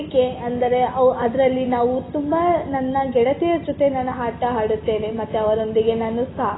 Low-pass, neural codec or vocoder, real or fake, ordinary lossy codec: 7.2 kHz; none; real; AAC, 16 kbps